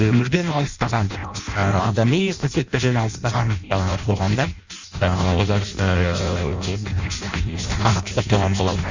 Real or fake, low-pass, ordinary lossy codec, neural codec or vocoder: fake; 7.2 kHz; Opus, 64 kbps; codec, 16 kHz in and 24 kHz out, 0.6 kbps, FireRedTTS-2 codec